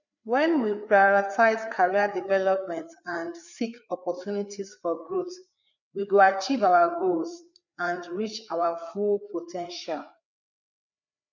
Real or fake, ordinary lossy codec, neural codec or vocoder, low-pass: fake; none; codec, 16 kHz, 4 kbps, FreqCodec, larger model; 7.2 kHz